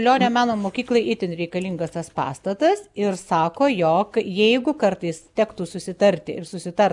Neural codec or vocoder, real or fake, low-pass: none; real; 10.8 kHz